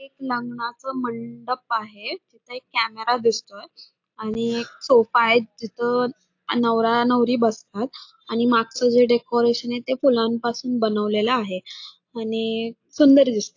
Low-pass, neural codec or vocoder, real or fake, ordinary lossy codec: 7.2 kHz; none; real; AAC, 48 kbps